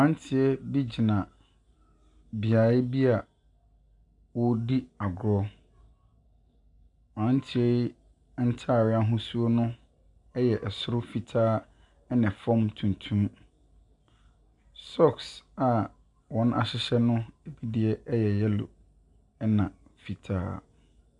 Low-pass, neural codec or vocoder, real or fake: 10.8 kHz; none; real